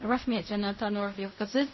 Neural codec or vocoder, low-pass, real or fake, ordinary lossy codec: codec, 16 kHz in and 24 kHz out, 0.4 kbps, LongCat-Audio-Codec, fine tuned four codebook decoder; 7.2 kHz; fake; MP3, 24 kbps